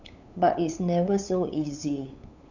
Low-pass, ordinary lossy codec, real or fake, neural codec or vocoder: 7.2 kHz; none; fake; codec, 16 kHz, 8 kbps, FunCodec, trained on LibriTTS, 25 frames a second